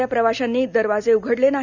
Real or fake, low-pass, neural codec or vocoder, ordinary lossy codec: real; 7.2 kHz; none; none